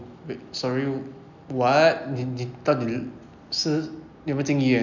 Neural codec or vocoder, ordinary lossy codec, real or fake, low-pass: none; none; real; 7.2 kHz